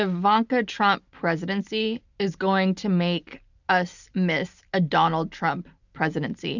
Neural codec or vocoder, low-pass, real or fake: none; 7.2 kHz; real